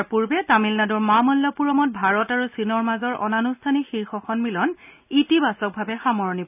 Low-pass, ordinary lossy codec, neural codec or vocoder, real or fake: 3.6 kHz; none; none; real